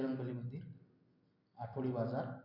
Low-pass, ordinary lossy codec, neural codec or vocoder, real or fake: 5.4 kHz; none; none; real